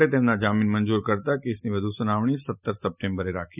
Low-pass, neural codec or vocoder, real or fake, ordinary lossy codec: 3.6 kHz; none; real; none